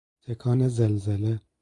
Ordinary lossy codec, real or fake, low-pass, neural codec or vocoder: AAC, 48 kbps; real; 10.8 kHz; none